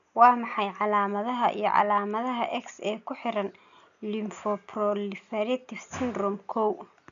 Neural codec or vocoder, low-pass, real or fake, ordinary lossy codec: none; 7.2 kHz; real; none